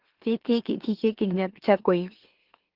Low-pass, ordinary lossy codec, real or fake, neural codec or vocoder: 5.4 kHz; Opus, 16 kbps; fake; autoencoder, 44.1 kHz, a latent of 192 numbers a frame, MeloTTS